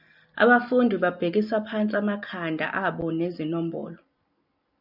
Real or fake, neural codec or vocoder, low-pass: real; none; 5.4 kHz